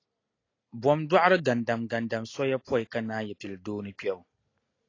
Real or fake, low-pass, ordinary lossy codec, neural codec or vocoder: real; 7.2 kHz; AAC, 32 kbps; none